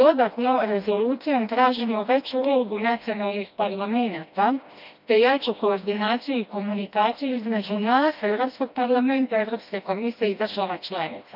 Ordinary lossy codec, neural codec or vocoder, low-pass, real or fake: none; codec, 16 kHz, 1 kbps, FreqCodec, smaller model; 5.4 kHz; fake